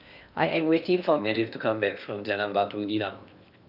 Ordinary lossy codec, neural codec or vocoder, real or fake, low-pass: none; codec, 16 kHz in and 24 kHz out, 0.8 kbps, FocalCodec, streaming, 65536 codes; fake; 5.4 kHz